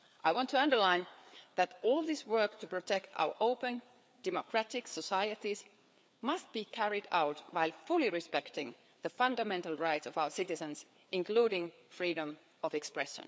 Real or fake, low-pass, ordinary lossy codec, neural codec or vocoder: fake; none; none; codec, 16 kHz, 4 kbps, FreqCodec, larger model